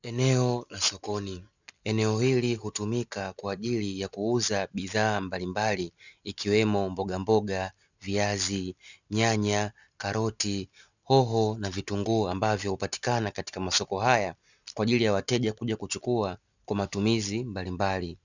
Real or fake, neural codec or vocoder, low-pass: real; none; 7.2 kHz